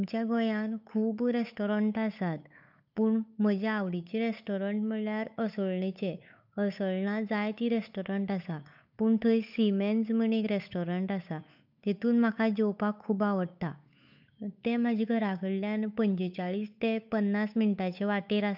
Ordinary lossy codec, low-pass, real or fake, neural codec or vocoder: none; 5.4 kHz; fake; codec, 16 kHz, 8 kbps, FunCodec, trained on Chinese and English, 25 frames a second